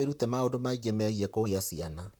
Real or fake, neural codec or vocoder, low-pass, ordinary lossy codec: fake; vocoder, 44.1 kHz, 128 mel bands, Pupu-Vocoder; none; none